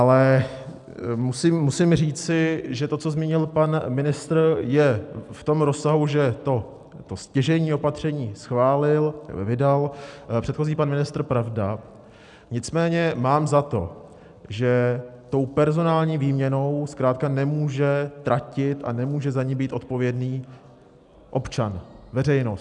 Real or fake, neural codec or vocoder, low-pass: real; none; 10.8 kHz